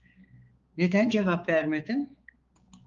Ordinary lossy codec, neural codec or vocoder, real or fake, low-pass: Opus, 32 kbps; codec, 16 kHz, 4 kbps, X-Codec, HuBERT features, trained on balanced general audio; fake; 7.2 kHz